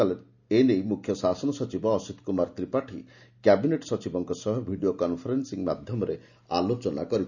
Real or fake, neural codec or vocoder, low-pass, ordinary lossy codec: real; none; 7.2 kHz; none